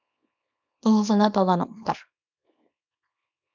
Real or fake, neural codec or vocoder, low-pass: fake; codec, 24 kHz, 0.9 kbps, WavTokenizer, small release; 7.2 kHz